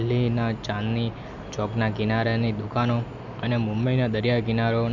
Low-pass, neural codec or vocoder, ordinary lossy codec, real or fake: 7.2 kHz; none; none; real